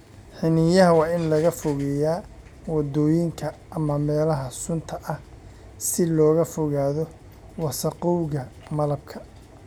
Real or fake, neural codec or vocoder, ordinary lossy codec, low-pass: real; none; none; 19.8 kHz